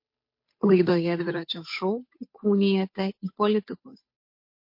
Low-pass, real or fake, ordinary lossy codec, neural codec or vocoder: 5.4 kHz; fake; MP3, 32 kbps; codec, 16 kHz, 8 kbps, FunCodec, trained on Chinese and English, 25 frames a second